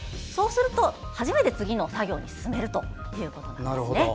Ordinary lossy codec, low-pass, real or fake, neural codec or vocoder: none; none; real; none